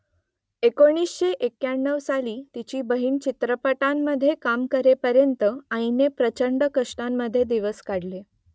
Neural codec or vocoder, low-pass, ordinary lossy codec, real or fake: none; none; none; real